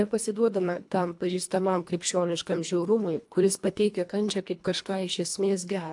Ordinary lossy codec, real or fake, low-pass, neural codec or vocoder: AAC, 64 kbps; fake; 10.8 kHz; codec, 24 kHz, 1.5 kbps, HILCodec